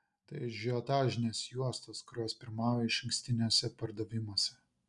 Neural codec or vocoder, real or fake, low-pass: none; real; 10.8 kHz